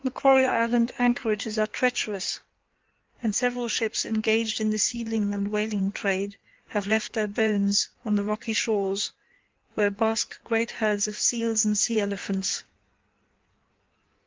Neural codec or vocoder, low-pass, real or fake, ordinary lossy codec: codec, 16 kHz in and 24 kHz out, 1.1 kbps, FireRedTTS-2 codec; 7.2 kHz; fake; Opus, 32 kbps